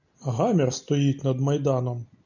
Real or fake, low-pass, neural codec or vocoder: real; 7.2 kHz; none